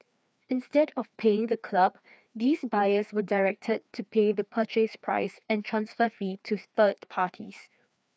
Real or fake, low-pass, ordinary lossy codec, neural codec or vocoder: fake; none; none; codec, 16 kHz, 2 kbps, FreqCodec, larger model